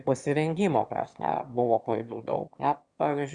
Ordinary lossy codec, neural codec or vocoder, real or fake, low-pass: Opus, 64 kbps; autoencoder, 22.05 kHz, a latent of 192 numbers a frame, VITS, trained on one speaker; fake; 9.9 kHz